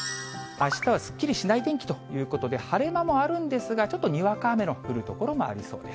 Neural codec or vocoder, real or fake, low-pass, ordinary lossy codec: none; real; none; none